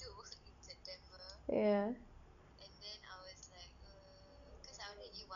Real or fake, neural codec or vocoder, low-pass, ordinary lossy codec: real; none; 7.2 kHz; none